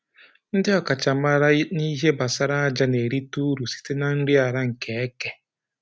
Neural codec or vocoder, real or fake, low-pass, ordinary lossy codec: none; real; none; none